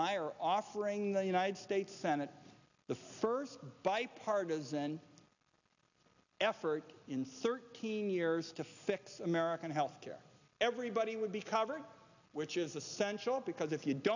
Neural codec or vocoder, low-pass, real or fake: none; 7.2 kHz; real